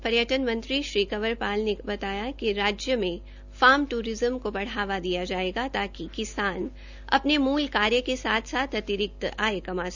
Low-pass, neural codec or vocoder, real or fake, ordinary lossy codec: 7.2 kHz; none; real; none